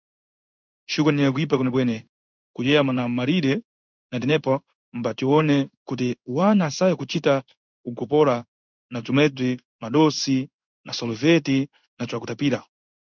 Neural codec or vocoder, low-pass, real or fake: codec, 16 kHz in and 24 kHz out, 1 kbps, XY-Tokenizer; 7.2 kHz; fake